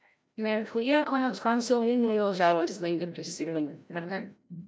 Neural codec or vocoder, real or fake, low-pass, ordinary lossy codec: codec, 16 kHz, 0.5 kbps, FreqCodec, larger model; fake; none; none